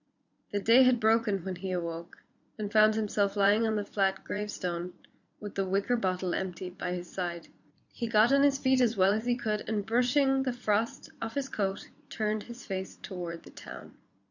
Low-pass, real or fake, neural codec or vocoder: 7.2 kHz; fake; vocoder, 44.1 kHz, 80 mel bands, Vocos